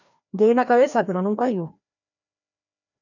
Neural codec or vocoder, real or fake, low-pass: codec, 16 kHz, 1 kbps, FreqCodec, larger model; fake; 7.2 kHz